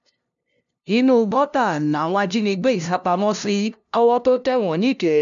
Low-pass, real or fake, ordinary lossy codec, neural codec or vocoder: 7.2 kHz; fake; none; codec, 16 kHz, 0.5 kbps, FunCodec, trained on LibriTTS, 25 frames a second